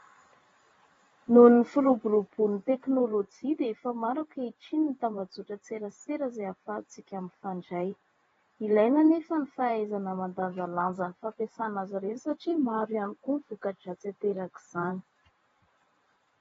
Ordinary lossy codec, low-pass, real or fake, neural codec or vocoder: AAC, 24 kbps; 19.8 kHz; fake; vocoder, 44.1 kHz, 128 mel bands every 512 samples, BigVGAN v2